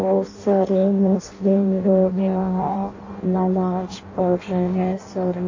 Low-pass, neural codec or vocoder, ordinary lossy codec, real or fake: 7.2 kHz; codec, 16 kHz in and 24 kHz out, 0.6 kbps, FireRedTTS-2 codec; AAC, 48 kbps; fake